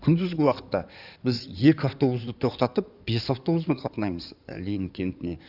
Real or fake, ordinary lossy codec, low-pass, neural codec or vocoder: fake; none; 5.4 kHz; codec, 16 kHz in and 24 kHz out, 2.2 kbps, FireRedTTS-2 codec